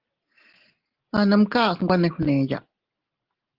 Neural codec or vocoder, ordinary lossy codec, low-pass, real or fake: none; Opus, 16 kbps; 5.4 kHz; real